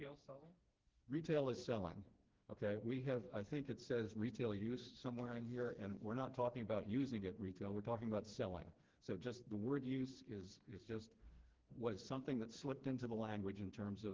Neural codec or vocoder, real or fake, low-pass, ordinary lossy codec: codec, 16 kHz, 2 kbps, FreqCodec, smaller model; fake; 7.2 kHz; Opus, 16 kbps